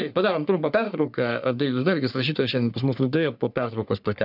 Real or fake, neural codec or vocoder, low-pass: fake; codec, 44.1 kHz, 3.4 kbps, Pupu-Codec; 5.4 kHz